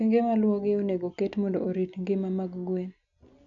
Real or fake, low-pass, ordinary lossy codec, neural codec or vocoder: real; 7.2 kHz; none; none